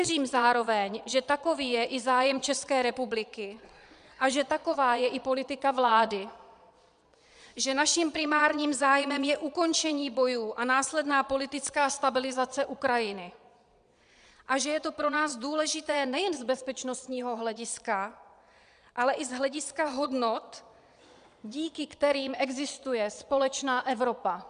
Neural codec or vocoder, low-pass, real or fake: vocoder, 22.05 kHz, 80 mel bands, Vocos; 9.9 kHz; fake